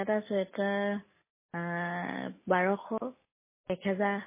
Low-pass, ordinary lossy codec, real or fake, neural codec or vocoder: 3.6 kHz; MP3, 16 kbps; real; none